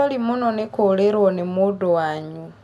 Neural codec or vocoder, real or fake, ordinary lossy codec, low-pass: none; real; none; 14.4 kHz